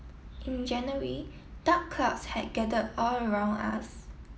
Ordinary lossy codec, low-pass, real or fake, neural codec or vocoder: none; none; real; none